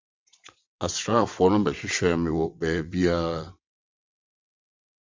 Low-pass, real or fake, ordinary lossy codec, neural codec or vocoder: 7.2 kHz; fake; MP3, 64 kbps; vocoder, 22.05 kHz, 80 mel bands, WaveNeXt